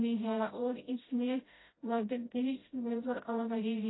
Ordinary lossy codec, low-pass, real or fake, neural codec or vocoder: AAC, 16 kbps; 7.2 kHz; fake; codec, 16 kHz, 0.5 kbps, FreqCodec, smaller model